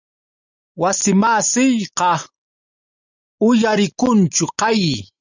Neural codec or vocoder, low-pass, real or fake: none; 7.2 kHz; real